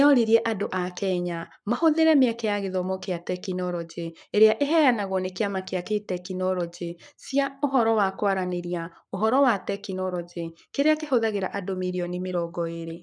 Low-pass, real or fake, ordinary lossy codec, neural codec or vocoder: 9.9 kHz; fake; none; codec, 44.1 kHz, 7.8 kbps, Pupu-Codec